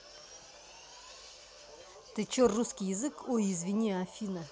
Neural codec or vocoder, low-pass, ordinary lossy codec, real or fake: none; none; none; real